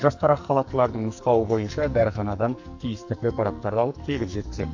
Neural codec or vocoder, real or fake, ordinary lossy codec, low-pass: codec, 44.1 kHz, 2.6 kbps, SNAC; fake; AAC, 48 kbps; 7.2 kHz